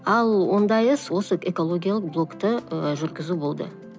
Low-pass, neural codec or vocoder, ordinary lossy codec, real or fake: none; none; none; real